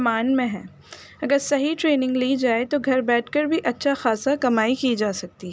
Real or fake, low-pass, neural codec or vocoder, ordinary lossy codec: real; none; none; none